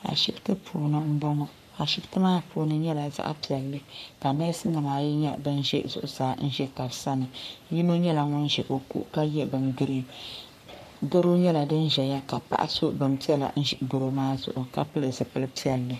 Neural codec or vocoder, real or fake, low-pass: codec, 44.1 kHz, 3.4 kbps, Pupu-Codec; fake; 14.4 kHz